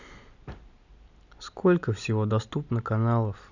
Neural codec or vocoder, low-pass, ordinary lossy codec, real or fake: none; 7.2 kHz; none; real